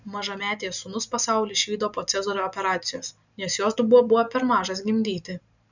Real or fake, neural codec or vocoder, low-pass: real; none; 7.2 kHz